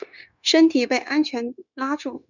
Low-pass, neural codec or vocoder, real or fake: 7.2 kHz; codec, 16 kHz, 0.9 kbps, LongCat-Audio-Codec; fake